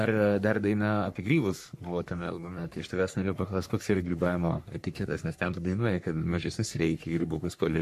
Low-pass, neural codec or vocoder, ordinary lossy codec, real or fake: 14.4 kHz; codec, 44.1 kHz, 3.4 kbps, Pupu-Codec; MP3, 64 kbps; fake